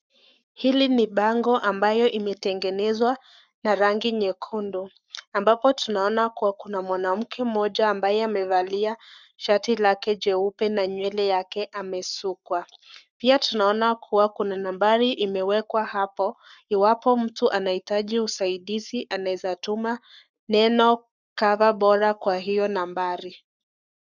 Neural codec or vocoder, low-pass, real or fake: codec, 44.1 kHz, 7.8 kbps, Pupu-Codec; 7.2 kHz; fake